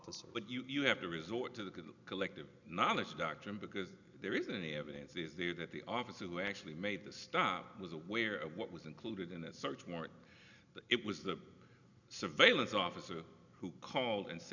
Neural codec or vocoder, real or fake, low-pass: none; real; 7.2 kHz